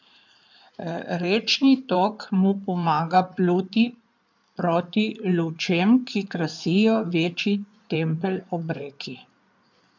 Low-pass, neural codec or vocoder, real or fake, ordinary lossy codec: 7.2 kHz; codec, 16 kHz in and 24 kHz out, 2.2 kbps, FireRedTTS-2 codec; fake; none